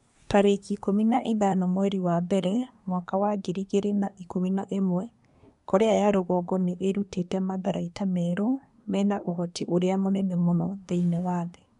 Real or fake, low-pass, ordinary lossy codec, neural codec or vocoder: fake; 10.8 kHz; none; codec, 24 kHz, 1 kbps, SNAC